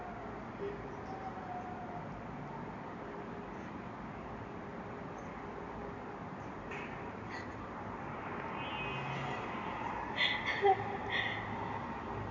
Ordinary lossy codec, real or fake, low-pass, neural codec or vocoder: none; real; 7.2 kHz; none